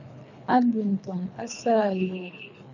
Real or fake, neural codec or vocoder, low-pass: fake; codec, 24 kHz, 3 kbps, HILCodec; 7.2 kHz